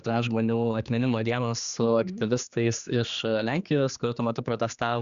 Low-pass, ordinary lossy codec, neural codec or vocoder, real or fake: 7.2 kHz; Opus, 64 kbps; codec, 16 kHz, 2 kbps, X-Codec, HuBERT features, trained on general audio; fake